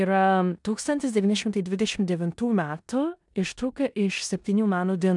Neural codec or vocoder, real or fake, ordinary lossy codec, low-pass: codec, 16 kHz in and 24 kHz out, 0.9 kbps, LongCat-Audio-Codec, four codebook decoder; fake; MP3, 96 kbps; 10.8 kHz